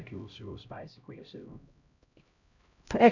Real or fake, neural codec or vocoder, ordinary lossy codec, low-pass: fake; codec, 16 kHz, 0.5 kbps, X-Codec, HuBERT features, trained on LibriSpeech; none; 7.2 kHz